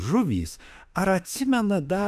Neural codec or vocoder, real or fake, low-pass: autoencoder, 48 kHz, 32 numbers a frame, DAC-VAE, trained on Japanese speech; fake; 14.4 kHz